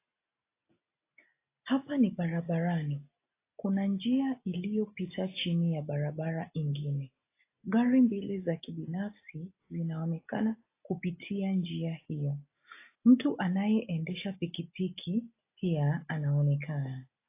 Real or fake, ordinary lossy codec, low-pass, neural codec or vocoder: real; AAC, 24 kbps; 3.6 kHz; none